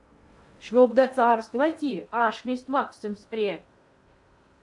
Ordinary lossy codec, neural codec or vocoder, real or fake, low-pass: AAC, 64 kbps; codec, 16 kHz in and 24 kHz out, 0.6 kbps, FocalCodec, streaming, 2048 codes; fake; 10.8 kHz